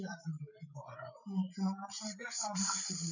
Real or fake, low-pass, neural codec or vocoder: fake; 7.2 kHz; codec, 16 kHz, 8 kbps, FreqCodec, larger model